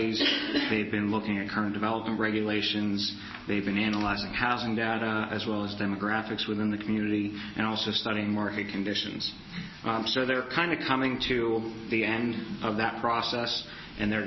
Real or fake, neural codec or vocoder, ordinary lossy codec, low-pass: real; none; MP3, 24 kbps; 7.2 kHz